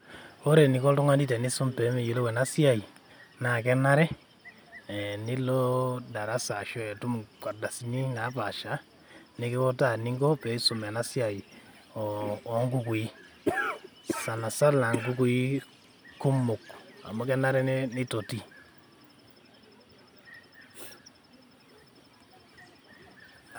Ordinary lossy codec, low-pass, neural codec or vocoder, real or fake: none; none; none; real